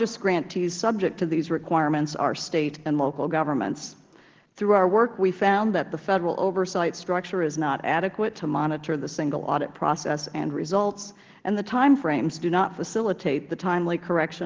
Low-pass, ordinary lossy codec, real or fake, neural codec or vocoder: 7.2 kHz; Opus, 16 kbps; real; none